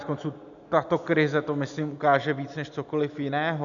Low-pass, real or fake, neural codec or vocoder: 7.2 kHz; real; none